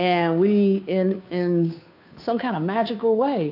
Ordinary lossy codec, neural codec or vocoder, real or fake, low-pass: AAC, 48 kbps; codec, 16 kHz, 2 kbps, FunCodec, trained on Chinese and English, 25 frames a second; fake; 5.4 kHz